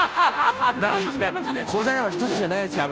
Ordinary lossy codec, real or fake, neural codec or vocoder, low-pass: none; fake; codec, 16 kHz, 0.5 kbps, FunCodec, trained on Chinese and English, 25 frames a second; none